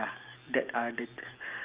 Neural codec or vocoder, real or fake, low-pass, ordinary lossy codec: none; real; 3.6 kHz; Opus, 24 kbps